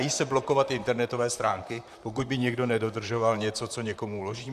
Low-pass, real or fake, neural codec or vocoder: 14.4 kHz; fake; vocoder, 44.1 kHz, 128 mel bands, Pupu-Vocoder